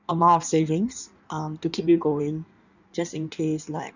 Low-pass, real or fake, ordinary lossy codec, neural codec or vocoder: 7.2 kHz; fake; none; codec, 16 kHz in and 24 kHz out, 1.1 kbps, FireRedTTS-2 codec